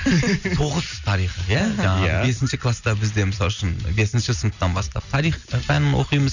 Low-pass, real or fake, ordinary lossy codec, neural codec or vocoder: 7.2 kHz; real; none; none